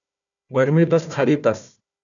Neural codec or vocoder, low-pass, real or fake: codec, 16 kHz, 1 kbps, FunCodec, trained on Chinese and English, 50 frames a second; 7.2 kHz; fake